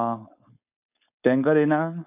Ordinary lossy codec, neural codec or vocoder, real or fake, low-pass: none; codec, 16 kHz, 4.8 kbps, FACodec; fake; 3.6 kHz